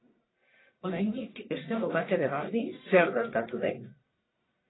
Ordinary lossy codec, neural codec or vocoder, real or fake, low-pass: AAC, 16 kbps; codec, 44.1 kHz, 1.7 kbps, Pupu-Codec; fake; 7.2 kHz